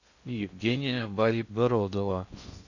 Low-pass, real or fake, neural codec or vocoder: 7.2 kHz; fake; codec, 16 kHz in and 24 kHz out, 0.6 kbps, FocalCodec, streaming, 2048 codes